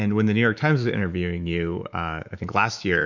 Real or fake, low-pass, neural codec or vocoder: fake; 7.2 kHz; autoencoder, 48 kHz, 128 numbers a frame, DAC-VAE, trained on Japanese speech